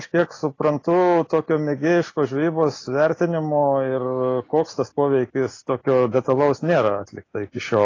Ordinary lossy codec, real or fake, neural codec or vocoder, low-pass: AAC, 32 kbps; real; none; 7.2 kHz